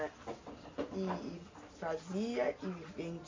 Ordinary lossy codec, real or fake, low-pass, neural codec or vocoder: MP3, 48 kbps; real; 7.2 kHz; none